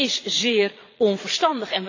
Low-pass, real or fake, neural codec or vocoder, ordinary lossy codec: 7.2 kHz; fake; vocoder, 22.05 kHz, 80 mel bands, Vocos; AAC, 32 kbps